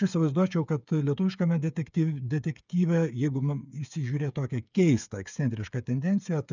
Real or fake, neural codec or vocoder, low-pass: fake; codec, 16 kHz, 8 kbps, FreqCodec, smaller model; 7.2 kHz